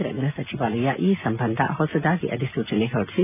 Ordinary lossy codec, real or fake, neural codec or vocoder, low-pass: none; real; none; 3.6 kHz